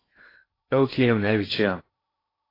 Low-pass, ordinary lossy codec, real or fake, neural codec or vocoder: 5.4 kHz; AAC, 24 kbps; fake; codec, 16 kHz in and 24 kHz out, 0.8 kbps, FocalCodec, streaming, 65536 codes